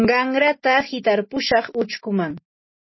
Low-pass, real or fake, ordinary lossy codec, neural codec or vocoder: 7.2 kHz; real; MP3, 24 kbps; none